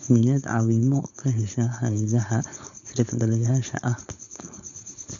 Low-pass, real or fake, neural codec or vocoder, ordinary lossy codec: 7.2 kHz; fake; codec, 16 kHz, 4.8 kbps, FACodec; none